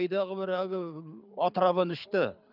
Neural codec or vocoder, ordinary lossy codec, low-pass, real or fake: codec, 24 kHz, 6 kbps, HILCodec; none; 5.4 kHz; fake